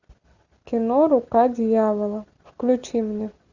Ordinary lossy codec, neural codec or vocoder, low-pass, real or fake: Opus, 64 kbps; none; 7.2 kHz; real